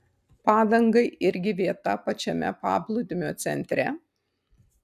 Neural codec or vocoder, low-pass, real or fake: none; 14.4 kHz; real